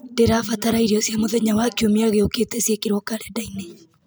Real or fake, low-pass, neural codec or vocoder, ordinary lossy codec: real; none; none; none